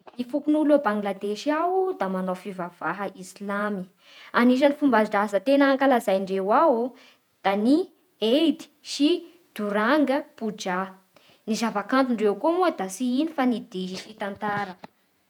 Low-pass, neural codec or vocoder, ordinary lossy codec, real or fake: 19.8 kHz; vocoder, 48 kHz, 128 mel bands, Vocos; none; fake